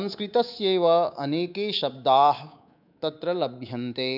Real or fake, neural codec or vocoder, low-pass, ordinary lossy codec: fake; codec, 24 kHz, 3.1 kbps, DualCodec; 5.4 kHz; none